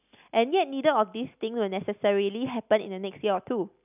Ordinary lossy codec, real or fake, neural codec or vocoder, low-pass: none; real; none; 3.6 kHz